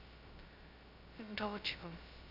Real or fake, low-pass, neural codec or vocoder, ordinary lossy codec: fake; 5.4 kHz; codec, 16 kHz, 0.2 kbps, FocalCodec; none